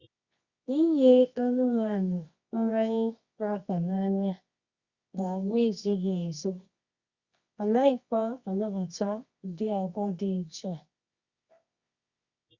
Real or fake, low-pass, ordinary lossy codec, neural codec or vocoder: fake; 7.2 kHz; Opus, 64 kbps; codec, 24 kHz, 0.9 kbps, WavTokenizer, medium music audio release